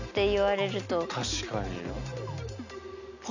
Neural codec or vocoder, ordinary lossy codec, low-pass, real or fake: none; none; 7.2 kHz; real